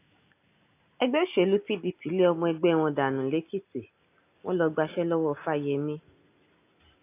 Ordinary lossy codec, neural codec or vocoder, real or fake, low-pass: AAC, 24 kbps; none; real; 3.6 kHz